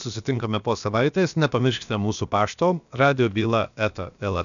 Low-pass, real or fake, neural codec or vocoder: 7.2 kHz; fake; codec, 16 kHz, about 1 kbps, DyCAST, with the encoder's durations